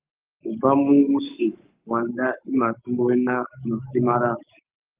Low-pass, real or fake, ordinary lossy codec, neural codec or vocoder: 3.6 kHz; fake; Opus, 32 kbps; codec, 44.1 kHz, 7.8 kbps, Pupu-Codec